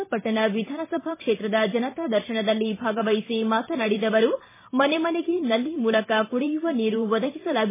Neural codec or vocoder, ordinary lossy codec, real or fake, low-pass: vocoder, 44.1 kHz, 128 mel bands every 512 samples, BigVGAN v2; MP3, 16 kbps; fake; 3.6 kHz